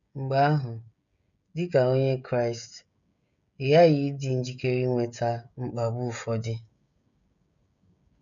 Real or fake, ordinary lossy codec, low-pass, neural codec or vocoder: fake; none; 7.2 kHz; codec, 16 kHz, 16 kbps, FreqCodec, smaller model